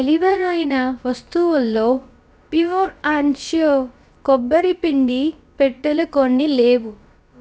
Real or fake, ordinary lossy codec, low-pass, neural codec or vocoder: fake; none; none; codec, 16 kHz, about 1 kbps, DyCAST, with the encoder's durations